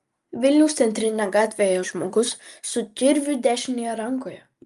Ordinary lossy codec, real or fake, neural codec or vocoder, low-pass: Opus, 32 kbps; real; none; 14.4 kHz